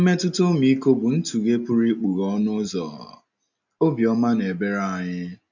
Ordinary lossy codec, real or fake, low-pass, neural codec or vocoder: none; real; 7.2 kHz; none